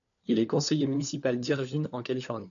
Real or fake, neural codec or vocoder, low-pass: fake; codec, 16 kHz, 4 kbps, FunCodec, trained on LibriTTS, 50 frames a second; 7.2 kHz